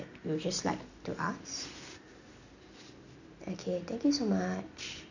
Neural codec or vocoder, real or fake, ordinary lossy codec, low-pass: none; real; none; 7.2 kHz